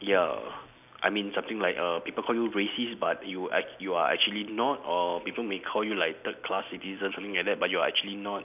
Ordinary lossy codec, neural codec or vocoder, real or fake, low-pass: none; none; real; 3.6 kHz